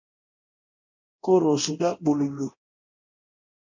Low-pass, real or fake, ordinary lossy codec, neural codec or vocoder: 7.2 kHz; fake; MP3, 64 kbps; codec, 24 kHz, 0.9 kbps, DualCodec